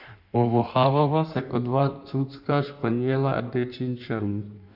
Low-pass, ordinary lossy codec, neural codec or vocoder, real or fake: 5.4 kHz; none; codec, 16 kHz in and 24 kHz out, 1.1 kbps, FireRedTTS-2 codec; fake